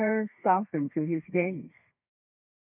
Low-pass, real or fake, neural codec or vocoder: 3.6 kHz; fake; codec, 32 kHz, 1.9 kbps, SNAC